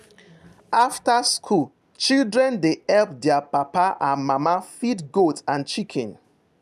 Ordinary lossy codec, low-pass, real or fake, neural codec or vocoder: none; 14.4 kHz; real; none